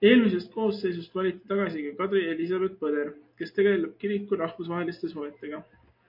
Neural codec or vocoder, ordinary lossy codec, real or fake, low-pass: none; MP3, 32 kbps; real; 5.4 kHz